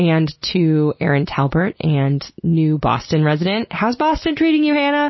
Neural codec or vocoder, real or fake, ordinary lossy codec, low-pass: none; real; MP3, 24 kbps; 7.2 kHz